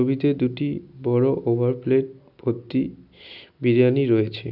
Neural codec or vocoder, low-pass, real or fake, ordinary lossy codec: none; 5.4 kHz; real; none